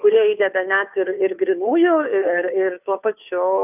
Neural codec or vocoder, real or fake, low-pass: codec, 16 kHz, 2 kbps, FunCodec, trained on Chinese and English, 25 frames a second; fake; 3.6 kHz